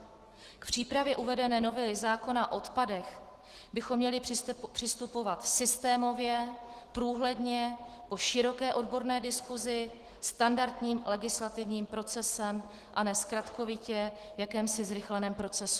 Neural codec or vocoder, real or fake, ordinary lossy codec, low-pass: autoencoder, 48 kHz, 128 numbers a frame, DAC-VAE, trained on Japanese speech; fake; Opus, 16 kbps; 14.4 kHz